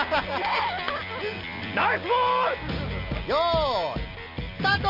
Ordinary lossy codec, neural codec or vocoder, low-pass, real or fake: none; none; 5.4 kHz; real